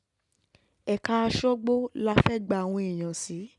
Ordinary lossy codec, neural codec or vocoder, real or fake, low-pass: none; none; real; 10.8 kHz